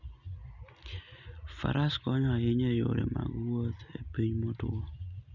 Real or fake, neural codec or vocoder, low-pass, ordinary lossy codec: real; none; 7.2 kHz; none